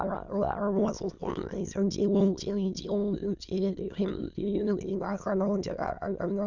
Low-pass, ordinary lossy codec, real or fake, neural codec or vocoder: 7.2 kHz; none; fake; autoencoder, 22.05 kHz, a latent of 192 numbers a frame, VITS, trained on many speakers